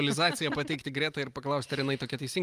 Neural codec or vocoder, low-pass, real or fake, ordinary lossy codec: none; 14.4 kHz; real; Opus, 32 kbps